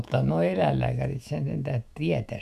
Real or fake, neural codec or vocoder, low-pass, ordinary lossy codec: fake; autoencoder, 48 kHz, 128 numbers a frame, DAC-VAE, trained on Japanese speech; 14.4 kHz; none